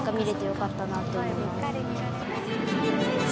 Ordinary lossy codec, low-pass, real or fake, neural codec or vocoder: none; none; real; none